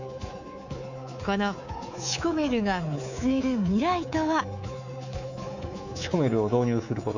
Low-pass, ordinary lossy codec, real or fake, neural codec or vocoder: 7.2 kHz; none; fake; codec, 24 kHz, 3.1 kbps, DualCodec